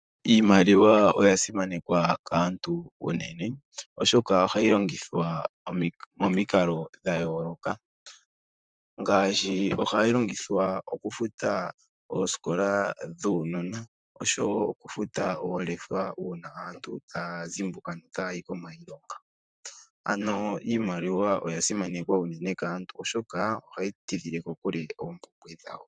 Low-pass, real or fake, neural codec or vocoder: 9.9 kHz; fake; vocoder, 44.1 kHz, 128 mel bands, Pupu-Vocoder